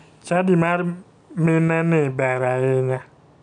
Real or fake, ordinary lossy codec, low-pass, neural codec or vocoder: real; none; 9.9 kHz; none